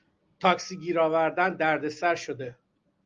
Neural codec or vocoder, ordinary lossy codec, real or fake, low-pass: none; Opus, 32 kbps; real; 7.2 kHz